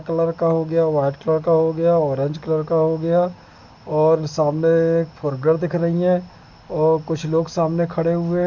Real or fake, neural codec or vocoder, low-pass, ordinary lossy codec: real; none; 7.2 kHz; none